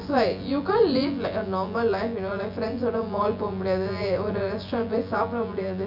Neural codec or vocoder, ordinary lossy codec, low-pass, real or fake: vocoder, 24 kHz, 100 mel bands, Vocos; none; 5.4 kHz; fake